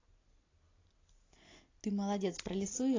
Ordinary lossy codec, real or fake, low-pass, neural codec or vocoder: AAC, 32 kbps; real; 7.2 kHz; none